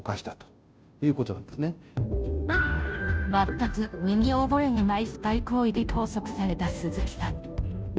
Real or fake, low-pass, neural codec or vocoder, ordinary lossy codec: fake; none; codec, 16 kHz, 0.5 kbps, FunCodec, trained on Chinese and English, 25 frames a second; none